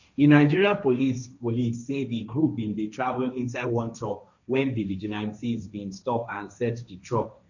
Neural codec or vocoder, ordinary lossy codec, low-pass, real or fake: codec, 16 kHz, 1.1 kbps, Voila-Tokenizer; none; 7.2 kHz; fake